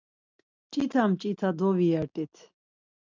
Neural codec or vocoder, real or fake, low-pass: none; real; 7.2 kHz